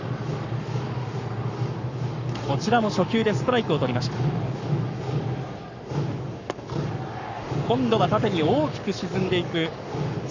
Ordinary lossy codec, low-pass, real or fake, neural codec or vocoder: none; 7.2 kHz; fake; codec, 44.1 kHz, 7.8 kbps, Pupu-Codec